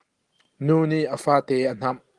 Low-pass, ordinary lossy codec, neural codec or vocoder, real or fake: 10.8 kHz; Opus, 24 kbps; none; real